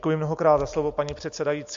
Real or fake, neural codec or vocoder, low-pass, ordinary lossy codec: real; none; 7.2 kHz; MP3, 48 kbps